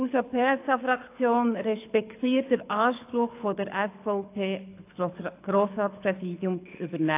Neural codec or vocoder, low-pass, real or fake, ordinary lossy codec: codec, 24 kHz, 6 kbps, HILCodec; 3.6 kHz; fake; AAC, 24 kbps